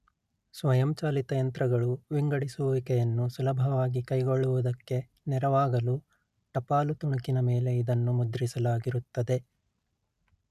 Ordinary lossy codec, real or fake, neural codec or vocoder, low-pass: none; real; none; 14.4 kHz